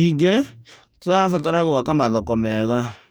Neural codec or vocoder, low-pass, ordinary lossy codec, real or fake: codec, 44.1 kHz, 2.6 kbps, DAC; none; none; fake